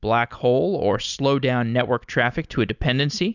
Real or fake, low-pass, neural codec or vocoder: real; 7.2 kHz; none